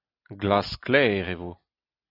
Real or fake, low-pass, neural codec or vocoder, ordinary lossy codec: real; 5.4 kHz; none; AAC, 48 kbps